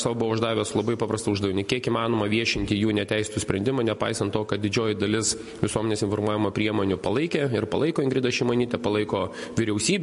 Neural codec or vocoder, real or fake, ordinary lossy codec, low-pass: none; real; MP3, 48 kbps; 14.4 kHz